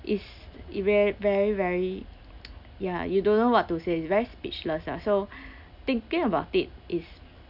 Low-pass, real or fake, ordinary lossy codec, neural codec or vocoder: 5.4 kHz; real; none; none